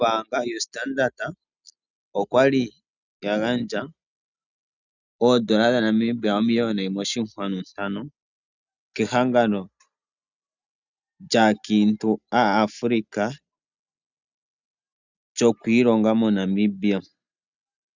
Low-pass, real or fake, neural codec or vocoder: 7.2 kHz; real; none